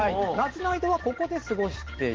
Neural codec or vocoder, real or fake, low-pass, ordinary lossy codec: none; real; 7.2 kHz; Opus, 16 kbps